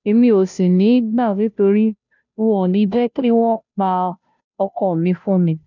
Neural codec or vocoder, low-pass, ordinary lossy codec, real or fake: codec, 16 kHz, 0.5 kbps, FunCodec, trained on Chinese and English, 25 frames a second; 7.2 kHz; none; fake